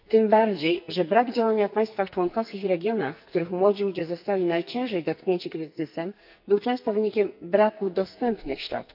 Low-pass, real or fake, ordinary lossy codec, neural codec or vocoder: 5.4 kHz; fake; none; codec, 44.1 kHz, 2.6 kbps, SNAC